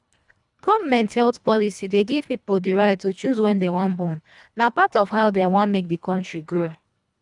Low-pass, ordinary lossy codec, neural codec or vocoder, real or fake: 10.8 kHz; none; codec, 24 kHz, 1.5 kbps, HILCodec; fake